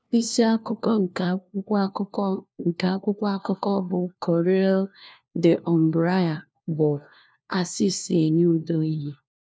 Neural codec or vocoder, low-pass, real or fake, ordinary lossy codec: codec, 16 kHz, 1 kbps, FunCodec, trained on LibriTTS, 50 frames a second; none; fake; none